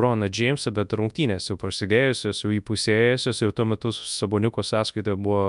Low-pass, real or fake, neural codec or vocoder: 10.8 kHz; fake; codec, 24 kHz, 0.9 kbps, WavTokenizer, large speech release